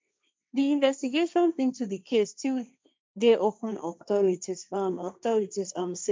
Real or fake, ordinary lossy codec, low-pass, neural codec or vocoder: fake; none; none; codec, 16 kHz, 1.1 kbps, Voila-Tokenizer